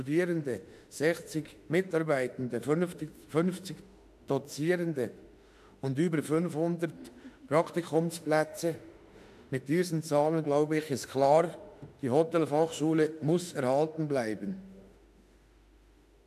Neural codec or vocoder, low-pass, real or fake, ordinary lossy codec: autoencoder, 48 kHz, 32 numbers a frame, DAC-VAE, trained on Japanese speech; 14.4 kHz; fake; AAC, 96 kbps